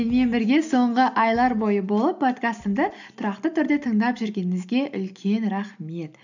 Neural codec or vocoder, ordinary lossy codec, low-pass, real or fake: none; none; 7.2 kHz; real